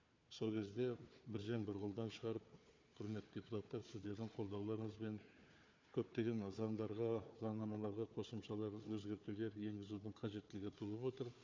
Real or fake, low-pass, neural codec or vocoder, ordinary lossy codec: fake; 7.2 kHz; codec, 16 kHz, 4 kbps, FunCodec, trained on Chinese and English, 50 frames a second; MP3, 64 kbps